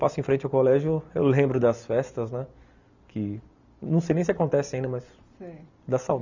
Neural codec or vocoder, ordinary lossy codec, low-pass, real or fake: none; none; 7.2 kHz; real